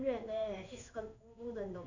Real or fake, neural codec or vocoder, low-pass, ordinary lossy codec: fake; codec, 16 kHz in and 24 kHz out, 1 kbps, XY-Tokenizer; 7.2 kHz; none